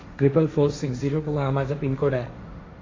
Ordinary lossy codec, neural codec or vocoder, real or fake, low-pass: AAC, 32 kbps; codec, 16 kHz, 1.1 kbps, Voila-Tokenizer; fake; 7.2 kHz